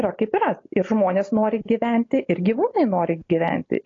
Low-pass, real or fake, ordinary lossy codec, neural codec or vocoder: 7.2 kHz; real; AAC, 32 kbps; none